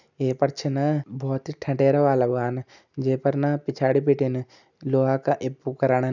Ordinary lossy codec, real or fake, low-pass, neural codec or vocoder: none; real; 7.2 kHz; none